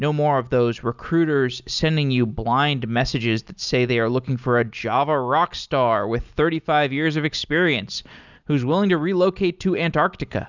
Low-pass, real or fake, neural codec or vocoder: 7.2 kHz; real; none